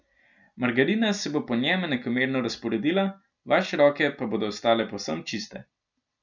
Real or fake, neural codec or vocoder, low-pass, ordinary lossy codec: real; none; 7.2 kHz; none